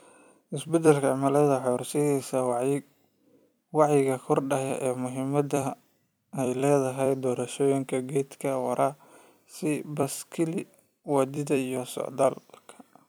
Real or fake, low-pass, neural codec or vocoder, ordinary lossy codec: fake; none; vocoder, 44.1 kHz, 128 mel bands every 256 samples, BigVGAN v2; none